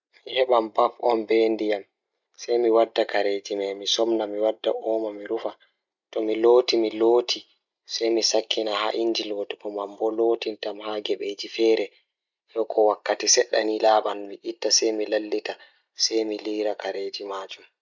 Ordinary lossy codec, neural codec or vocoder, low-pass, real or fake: none; none; 7.2 kHz; real